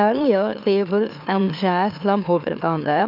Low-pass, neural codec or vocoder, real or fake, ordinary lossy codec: 5.4 kHz; autoencoder, 44.1 kHz, a latent of 192 numbers a frame, MeloTTS; fake; none